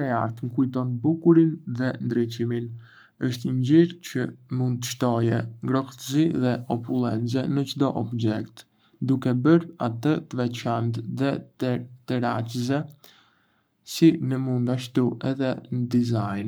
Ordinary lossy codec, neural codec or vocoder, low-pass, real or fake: none; codec, 44.1 kHz, 7.8 kbps, Pupu-Codec; none; fake